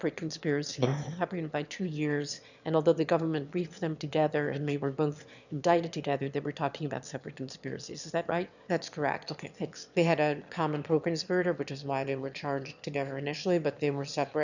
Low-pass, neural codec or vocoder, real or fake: 7.2 kHz; autoencoder, 22.05 kHz, a latent of 192 numbers a frame, VITS, trained on one speaker; fake